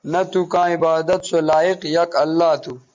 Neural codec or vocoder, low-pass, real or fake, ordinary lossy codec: none; 7.2 kHz; real; MP3, 64 kbps